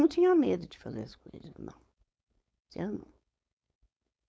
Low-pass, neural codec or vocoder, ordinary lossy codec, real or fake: none; codec, 16 kHz, 4.8 kbps, FACodec; none; fake